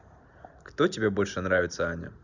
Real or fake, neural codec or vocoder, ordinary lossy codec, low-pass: real; none; none; 7.2 kHz